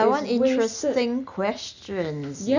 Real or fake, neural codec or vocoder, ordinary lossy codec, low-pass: real; none; none; 7.2 kHz